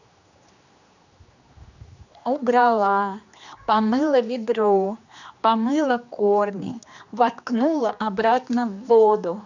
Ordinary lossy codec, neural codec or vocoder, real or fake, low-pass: none; codec, 16 kHz, 2 kbps, X-Codec, HuBERT features, trained on general audio; fake; 7.2 kHz